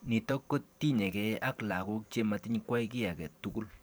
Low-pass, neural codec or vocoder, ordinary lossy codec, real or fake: none; vocoder, 44.1 kHz, 128 mel bands every 512 samples, BigVGAN v2; none; fake